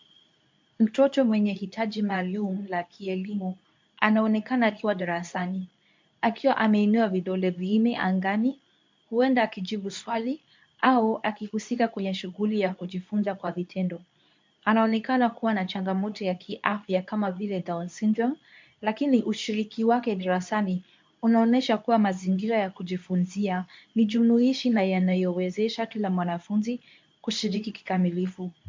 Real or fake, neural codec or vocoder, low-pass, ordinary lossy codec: fake; codec, 24 kHz, 0.9 kbps, WavTokenizer, medium speech release version 2; 7.2 kHz; MP3, 64 kbps